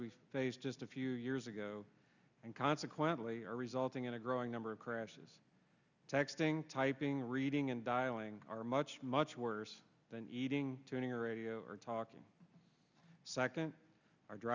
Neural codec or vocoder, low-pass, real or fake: none; 7.2 kHz; real